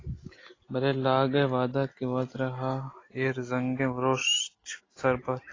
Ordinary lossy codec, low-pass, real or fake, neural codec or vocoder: AAC, 32 kbps; 7.2 kHz; real; none